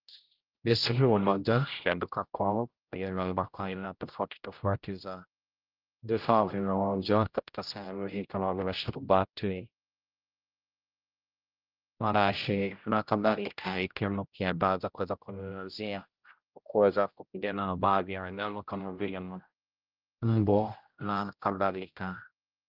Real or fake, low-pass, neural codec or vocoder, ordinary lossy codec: fake; 5.4 kHz; codec, 16 kHz, 0.5 kbps, X-Codec, HuBERT features, trained on general audio; Opus, 32 kbps